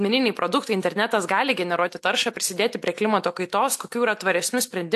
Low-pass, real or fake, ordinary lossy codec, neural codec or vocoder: 14.4 kHz; real; AAC, 64 kbps; none